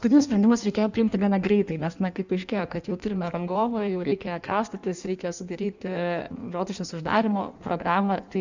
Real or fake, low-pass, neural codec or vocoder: fake; 7.2 kHz; codec, 16 kHz in and 24 kHz out, 1.1 kbps, FireRedTTS-2 codec